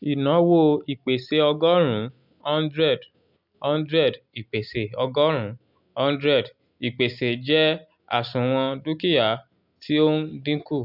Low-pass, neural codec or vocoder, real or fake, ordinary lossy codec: 5.4 kHz; none; real; none